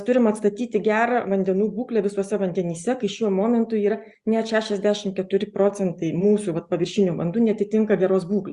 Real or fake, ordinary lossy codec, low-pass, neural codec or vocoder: real; Opus, 64 kbps; 10.8 kHz; none